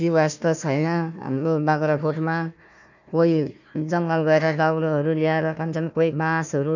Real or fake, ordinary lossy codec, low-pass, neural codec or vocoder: fake; none; 7.2 kHz; codec, 16 kHz, 1 kbps, FunCodec, trained on Chinese and English, 50 frames a second